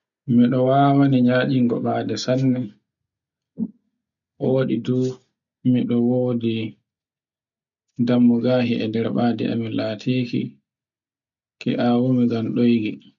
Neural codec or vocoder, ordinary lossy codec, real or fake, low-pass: none; none; real; 7.2 kHz